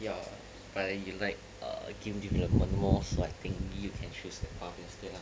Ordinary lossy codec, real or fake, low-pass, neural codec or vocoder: none; real; none; none